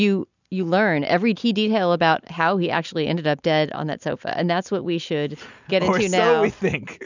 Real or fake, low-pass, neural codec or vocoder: real; 7.2 kHz; none